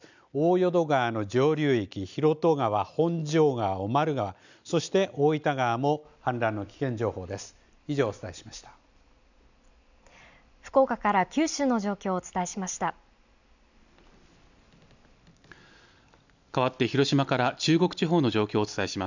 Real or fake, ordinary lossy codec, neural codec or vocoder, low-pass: real; none; none; 7.2 kHz